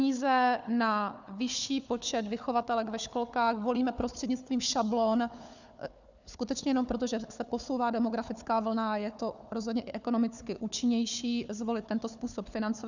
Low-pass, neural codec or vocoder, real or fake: 7.2 kHz; codec, 16 kHz, 4 kbps, FunCodec, trained on Chinese and English, 50 frames a second; fake